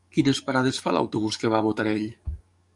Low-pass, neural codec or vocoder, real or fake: 10.8 kHz; codec, 44.1 kHz, 7.8 kbps, DAC; fake